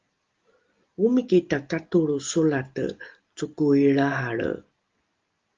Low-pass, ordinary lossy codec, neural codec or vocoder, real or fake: 7.2 kHz; Opus, 32 kbps; none; real